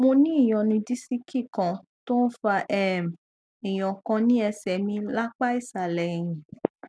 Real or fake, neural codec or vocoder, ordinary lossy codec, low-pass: real; none; none; none